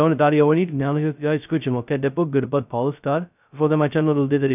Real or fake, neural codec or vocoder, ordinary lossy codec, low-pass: fake; codec, 16 kHz, 0.2 kbps, FocalCodec; none; 3.6 kHz